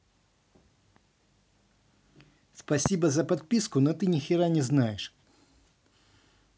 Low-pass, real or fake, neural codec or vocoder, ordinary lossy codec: none; real; none; none